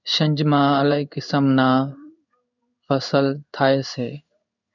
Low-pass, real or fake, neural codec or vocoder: 7.2 kHz; fake; codec, 16 kHz in and 24 kHz out, 1 kbps, XY-Tokenizer